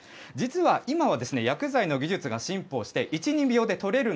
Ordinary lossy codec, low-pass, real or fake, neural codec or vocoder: none; none; real; none